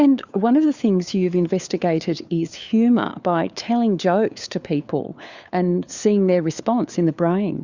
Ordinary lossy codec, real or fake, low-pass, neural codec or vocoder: Opus, 64 kbps; fake; 7.2 kHz; codec, 16 kHz, 4 kbps, FunCodec, trained on LibriTTS, 50 frames a second